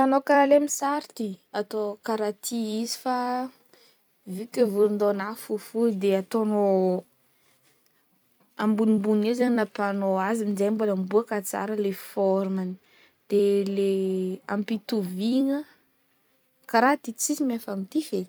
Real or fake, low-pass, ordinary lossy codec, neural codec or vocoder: fake; none; none; vocoder, 44.1 kHz, 128 mel bands every 256 samples, BigVGAN v2